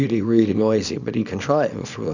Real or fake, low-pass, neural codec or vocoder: fake; 7.2 kHz; codec, 24 kHz, 0.9 kbps, WavTokenizer, small release